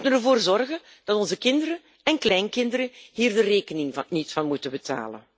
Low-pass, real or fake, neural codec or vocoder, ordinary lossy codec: none; real; none; none